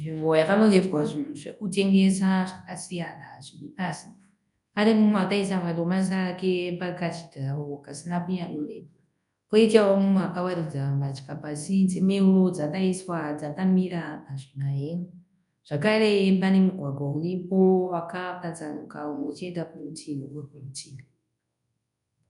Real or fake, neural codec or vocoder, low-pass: fake; codec, 24 kHz, 0.9 kbps, WavTokenizer, large speech release; 10.8 kHz